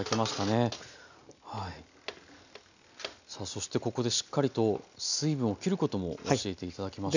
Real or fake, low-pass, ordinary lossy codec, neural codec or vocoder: real; 7.2 kHz; none; none